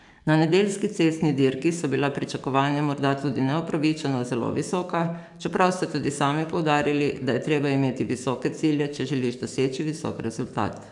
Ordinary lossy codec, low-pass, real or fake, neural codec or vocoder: none; 10.8 kHz; fake; codec, 44.1 kHz, 7.8 kbps, DAC